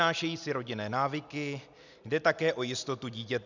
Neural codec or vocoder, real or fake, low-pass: none; real; 7.2 kHz